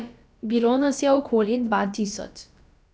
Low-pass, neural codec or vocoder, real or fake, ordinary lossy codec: none; codec, 16 kHz, about 1 kbps, DyCAST, with the encoder's durations; fake; none